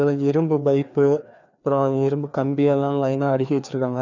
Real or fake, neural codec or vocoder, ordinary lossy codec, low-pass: fake; codec, 16 kHz, 2 kbps, FreqCodec, larger model; none; 7.2 kHz